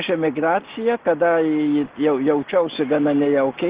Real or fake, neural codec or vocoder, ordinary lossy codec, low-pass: real; none; Opus, 16 kbps; 3.6 kHz